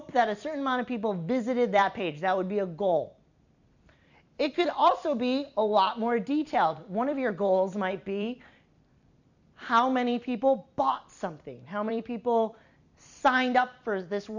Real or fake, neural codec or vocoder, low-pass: fake; vocoder, 44.1 kHz, 128 mel bands every 256 samples, BigVGAN v2; 7.2 kHz